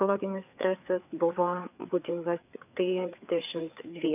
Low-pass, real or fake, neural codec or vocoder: 3.6 kHz; fake; codec, 16 kHz, 4 kbps, FreqCodec, larger model